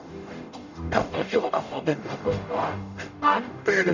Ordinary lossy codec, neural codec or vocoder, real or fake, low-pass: none; codec, 44.1 kHz, 0.9 kbps, DAC; fake; 7.2 kHz